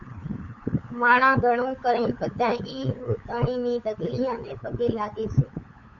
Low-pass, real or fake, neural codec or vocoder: 7.2 kHz; fake; codec, 16 kHz, 8 kbps, FunCodec, trained on LibriTTS, 25 frames a second